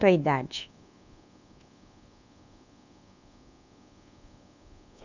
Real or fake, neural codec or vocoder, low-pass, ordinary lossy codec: fake; codec, 24 kHz, 1.2 kbps, DualCodec; 7.2 kHz; none